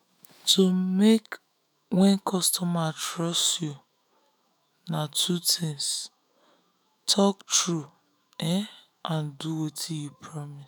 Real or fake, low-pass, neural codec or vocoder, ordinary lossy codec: fake; none; autoencoder, 48 kHz, 128 numbers a frame, DAC-VAE, trained on Japanese speech; none